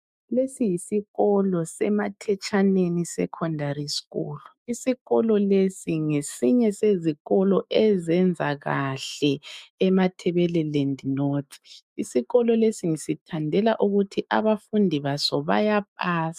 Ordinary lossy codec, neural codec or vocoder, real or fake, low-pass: MP3, 96 kbps; autoencoder, 48 kHz, 128 numbers a frame, DAC-VAE, trained on Japanese speech; fake; 14.4 kHz